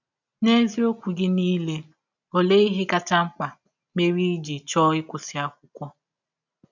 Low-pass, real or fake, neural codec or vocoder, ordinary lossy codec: 7.2 kHz; real; none; none